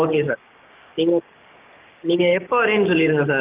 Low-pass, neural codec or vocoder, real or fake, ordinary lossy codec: 3.6 kHz; codec, 16 kHz, 6 kbps, DAC; fake; Opus, 32 kbps